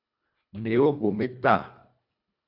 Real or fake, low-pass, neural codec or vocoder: fake; 5.4 kHz; codec, 24 kHz, 1.5 kbps, HILCodec